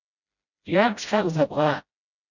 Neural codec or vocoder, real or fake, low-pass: codec, 16 kHz, 0.5 kbps, FreqCodec, smaller model; fake; 7.2 kHz